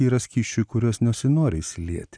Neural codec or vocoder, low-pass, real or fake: none; 9.9 kHz; real